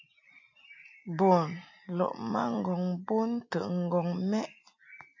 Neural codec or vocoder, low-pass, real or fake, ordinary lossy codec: none; 7.2 kHz; real; AAC, 48 kbps